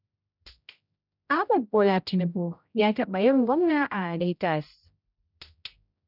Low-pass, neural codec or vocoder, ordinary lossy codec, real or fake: 5.4 kHz; codec, 16 kHz, 0.5 kbps, X-Codec, HuBERT features, trained on general audio; none; fake